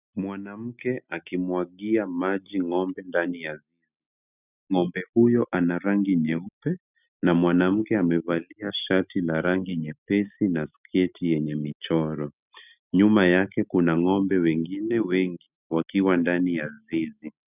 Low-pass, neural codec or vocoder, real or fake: 3.6 kHz; none; real